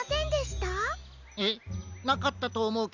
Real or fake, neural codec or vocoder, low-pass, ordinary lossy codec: real; none; 7.2 kHz; none